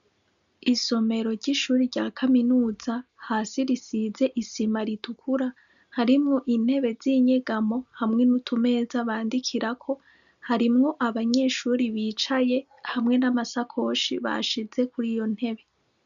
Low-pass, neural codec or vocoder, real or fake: 7.2 kHz; none; real